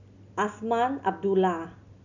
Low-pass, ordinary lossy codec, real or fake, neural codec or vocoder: 7.2 kHz; none; real; none